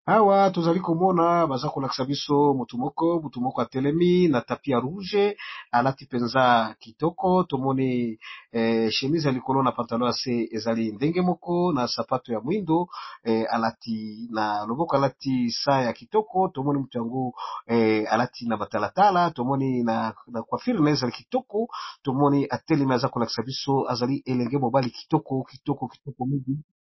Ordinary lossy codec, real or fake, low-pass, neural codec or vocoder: MP3, 24 kbps; real; 7.2 kHz; none